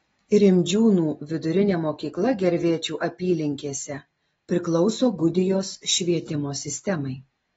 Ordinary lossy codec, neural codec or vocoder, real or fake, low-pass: AAC, 24 kbps; none; real; 14.4 kHz